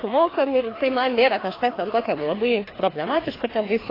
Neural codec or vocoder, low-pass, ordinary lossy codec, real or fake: codec, 16 kHz, 2 kbps, FreqCodec, larger model; 5.4 kHz; AAC, 24 kbps; fake